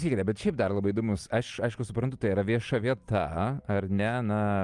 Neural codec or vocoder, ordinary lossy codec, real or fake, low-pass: none; Opus, 32 kbps; real; 10.8 kHz